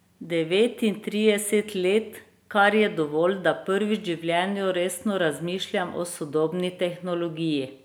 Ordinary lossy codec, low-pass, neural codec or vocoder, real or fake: none; none; none; real